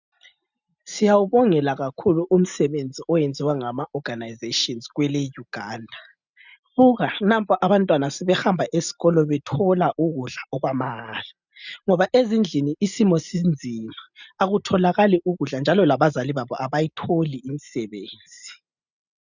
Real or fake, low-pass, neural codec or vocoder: real; 7.2 kHz; none